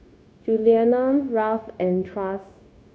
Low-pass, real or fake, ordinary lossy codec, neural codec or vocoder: none; fake; none; codec, 16 kHz, 0.9 kbps, LongCat-Audio-Codec